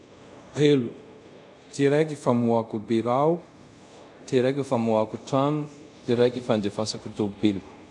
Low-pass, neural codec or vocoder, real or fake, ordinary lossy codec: none; codec, 24 kHz, 0.5 kbps, DualCodec; fake; none